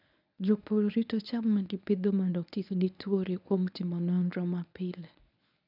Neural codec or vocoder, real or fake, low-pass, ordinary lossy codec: codec, 24 kHz, 0.9 kbps, WavTokenizer, medium speech release version 1; fake; 5.4 kHz; none